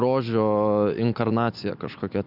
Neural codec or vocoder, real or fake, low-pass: none; real; 5.4 kHz